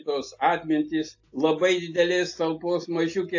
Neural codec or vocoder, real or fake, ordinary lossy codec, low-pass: none; real; AAC, 48 kbps; 7.2 kHz